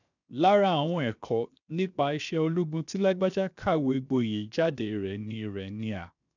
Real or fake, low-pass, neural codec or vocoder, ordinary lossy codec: fake; 7.2 kHz; codec, 16 kHz, 0.7 kbps, FocalCodec; none